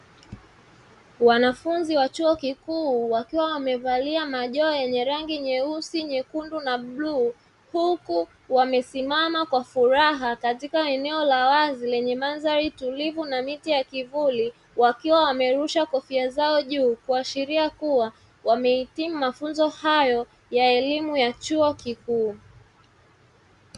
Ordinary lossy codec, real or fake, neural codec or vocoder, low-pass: Opus, 64 kbps; real; none; 10.8 kHz